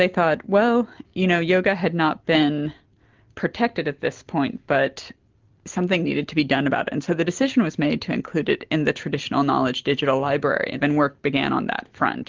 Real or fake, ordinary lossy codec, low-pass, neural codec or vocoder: real; Opus, 32 kbps; 7.2 kHz; none